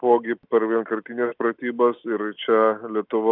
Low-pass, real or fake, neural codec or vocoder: 5.4 kHz; real; none